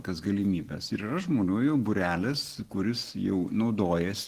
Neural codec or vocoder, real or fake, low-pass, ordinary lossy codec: none; real; 14.4 kHz; Opus, 16 kbps